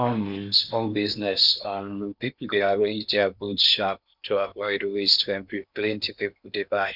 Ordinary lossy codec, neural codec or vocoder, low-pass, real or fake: none; codec, 16 kHz in and 24 kHz out, 0.8 kbps, FocalCodec, streaming, 65536 codes; 5.4 kHz; fake